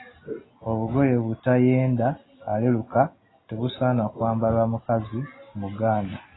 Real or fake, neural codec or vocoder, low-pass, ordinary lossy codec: real; none; 7.2 kHz; AAC, 16 kbps